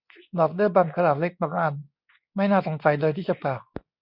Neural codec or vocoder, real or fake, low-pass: codec, 24 kHz, 0.9 kbps, WavTokenizer, medium speech release version 2; fake; 5.4 kHz